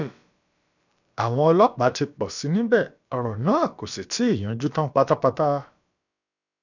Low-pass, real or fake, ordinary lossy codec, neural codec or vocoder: 7.2 kHz; fake; none; codec, 16 kHz, about 1 kbps, DyCAST, with the encoder's durations